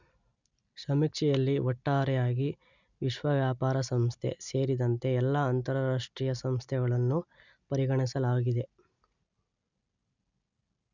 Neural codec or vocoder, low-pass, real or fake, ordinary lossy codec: none; 7.2 kHz; real; none